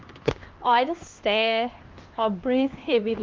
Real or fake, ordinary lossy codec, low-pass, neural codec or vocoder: fake; Opus, 32 kbps; 7.2 kHz; codec, 16 kHz, 2 kbps, X-Codec, HuBERT features, trained on LibriSpeech